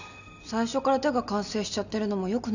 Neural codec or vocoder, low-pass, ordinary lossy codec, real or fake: none; 7.2 kHz; none; real